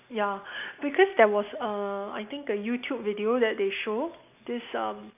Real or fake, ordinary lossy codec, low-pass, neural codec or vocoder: real; none; 3.6 kHz; none